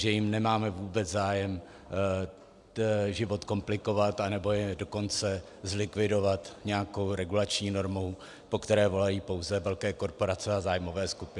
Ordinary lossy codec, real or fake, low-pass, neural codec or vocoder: MP3, 96 kbps; real; 10.8 kHz; none